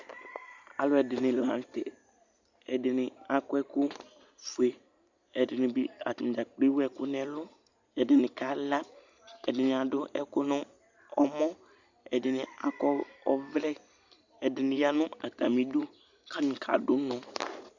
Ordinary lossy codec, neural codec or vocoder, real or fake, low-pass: Opus, 64 kbps; none; real; 7.2 kHz